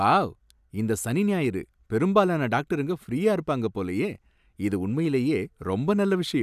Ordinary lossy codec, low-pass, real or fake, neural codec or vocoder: none; 14.4 kHz; real; none